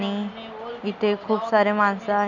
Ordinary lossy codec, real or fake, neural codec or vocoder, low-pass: none; real; none; 7.2 kHz